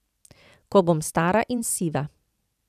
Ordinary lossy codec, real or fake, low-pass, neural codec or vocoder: none; fake; 14.4 kHz; vocoder, 44.1 kHz, 128 mel bands every 256 samples, BigVGAN v2